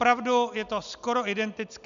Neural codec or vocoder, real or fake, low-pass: none; real; 7.2 kHz